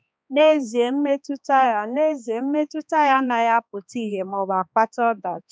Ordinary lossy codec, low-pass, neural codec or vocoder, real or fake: none; none; codec, 16 kHz, 2 kbps, X-Codec, HuBERT features, trained on balanced general audio; fake